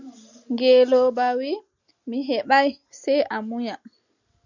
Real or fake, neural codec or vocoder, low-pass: real; none; 7.2 kHz